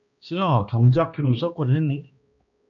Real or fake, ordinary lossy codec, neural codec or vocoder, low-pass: fake; MP3, 64 kbps; codec, 16 kHz, 1 kbps, X-Codec, HuBERT features, trained on balanced general audio; 7.2 kHz